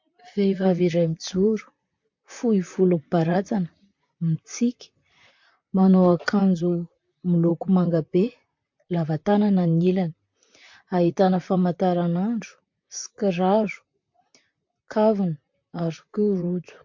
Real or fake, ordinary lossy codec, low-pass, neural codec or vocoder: fake; MP3, 48 kbps; 7.2 kHz; vocoder, 44.1 kHz, 128 mel bands, Pupu-Vocoder